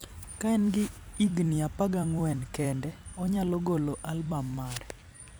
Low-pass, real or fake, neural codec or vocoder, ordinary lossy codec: none; fake; vocoder, 44.1 kHz, 128 mel bands every 256 samples, BigVGAN v2; none